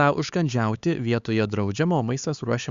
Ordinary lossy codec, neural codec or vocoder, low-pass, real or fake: Opus, 64 kbps; codec, 16 kHz, 4 kbps, X-Codec, HuBERT features, trained on LibriSpeech; 7.2 kHz; fake